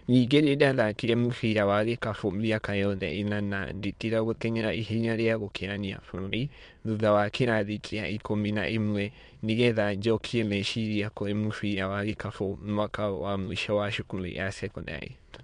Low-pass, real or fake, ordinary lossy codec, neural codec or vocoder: 9.9 kHz; fake; MP3, 64 kbps; autoencoder, 22.05 kHz, a latent of 192 numbers a frame, VITS, trained on many speakers